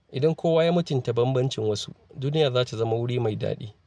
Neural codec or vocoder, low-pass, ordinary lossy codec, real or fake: none; none; none; real